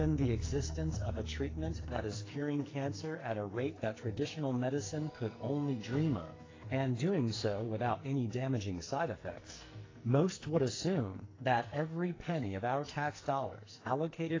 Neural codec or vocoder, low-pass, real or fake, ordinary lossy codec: codec, 44.1 kHz, 2.6 kbps, SNAC; 7.2 kHz; fake; AAC, 32 kbps